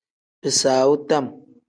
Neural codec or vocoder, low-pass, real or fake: none; 9.9 kHz; real